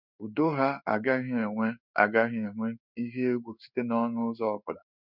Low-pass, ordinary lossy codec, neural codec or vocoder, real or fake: 5.4 kHz; none; codec, 16 kHz in and 24 kHz out, 1 kbps, XY-Tokenizer; fake